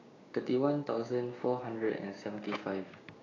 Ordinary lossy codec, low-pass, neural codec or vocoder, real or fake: AAC, 32 kbps; 7.2 kHz; codec, 16 kHz, 6 kbps, DAC; fake